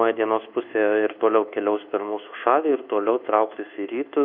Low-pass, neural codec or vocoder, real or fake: 5.4 kHz; codec, 24 kHz, 1.2 kbps, DualCodec; fake